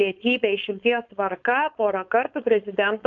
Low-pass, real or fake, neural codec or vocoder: 7.2 kHz; fake; codec, 16 kHz, 4.8 kbps, FACodec